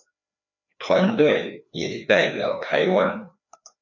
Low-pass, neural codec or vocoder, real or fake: 7.2 kHz; codec, 16 kHz, 2 kbps, FreqCodec, larger model; fake